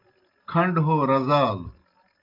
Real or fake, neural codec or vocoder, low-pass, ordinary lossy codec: real; none; 5.4 kHz; Opus, 24 kbps